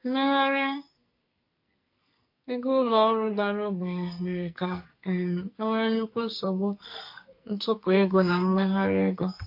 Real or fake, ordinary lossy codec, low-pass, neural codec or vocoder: fake; MP3, 32 kbps; 5.4 kHz; codec, 44.1 kHz, 2.6 kbps, SNAC